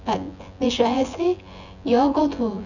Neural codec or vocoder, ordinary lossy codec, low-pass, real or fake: vocoder, 24 kHz, 100 mel bands, Vocos; none; 7.2 kHz; fake